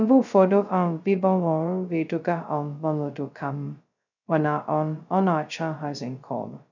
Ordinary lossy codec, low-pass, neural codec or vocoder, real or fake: none; 7.2 kHz; codec, 16 kHz, 0.2 kbps, FocalCodec; fake